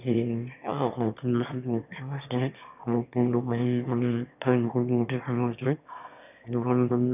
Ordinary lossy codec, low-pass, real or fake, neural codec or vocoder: none; 3.6 kHz; fake; autoencoder, 22.05 kHz, a latent of 192 numbers a frame, VITS, trained on one speaker